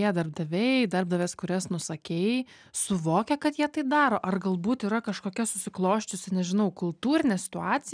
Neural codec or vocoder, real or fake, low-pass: none; real; 9.9 kHz